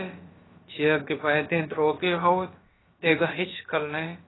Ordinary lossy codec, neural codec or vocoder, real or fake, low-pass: AAC, 16 kbps; codec, 16 kHz, about 1 kbps, DyCAST, with the encoder's durations; fake; 7.2 kHz